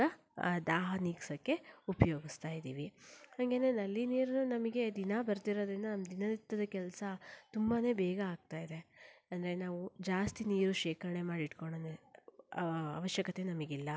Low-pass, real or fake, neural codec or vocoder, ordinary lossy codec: none; real; none; none